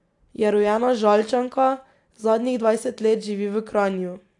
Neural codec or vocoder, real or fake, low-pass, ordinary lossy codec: none; real; 10.8 kHz; AAC, 64 kbps